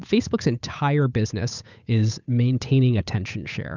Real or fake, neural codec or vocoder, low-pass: fake; codec, 16 kHz, 8 kbps, FunCodec, trained on Chinese and English, 25 frames a second; 7.2 kHz